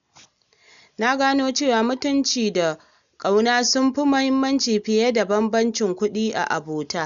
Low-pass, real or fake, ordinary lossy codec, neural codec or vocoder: 7.2 kHz; real; none; none